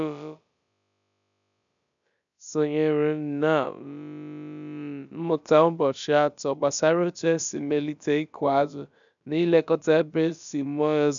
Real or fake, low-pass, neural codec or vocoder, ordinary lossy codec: fake; 7.2 kHz; codec, 16 kHz, about 1 kbps, DyCAST, with the encoder's durations; none